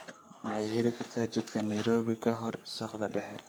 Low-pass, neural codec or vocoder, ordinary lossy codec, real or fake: none; codec, 44.1 kHz, 3.4 kbps, Pupu-Codec; none; fake